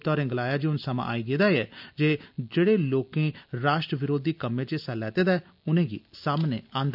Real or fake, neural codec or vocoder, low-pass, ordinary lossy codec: real; none; 5.4 kHz; none